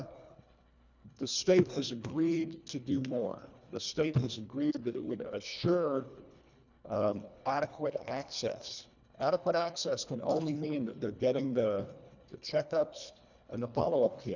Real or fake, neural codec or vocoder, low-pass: fake; codec, 24 kHz, 1.5 kbps, HILCodec; 7.2 kHz